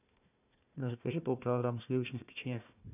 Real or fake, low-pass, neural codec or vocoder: fake; 3.6 kHz; codec, 16 kHz, 1 kbps, FunCodec, trained on Chinese and English, 50 frames a second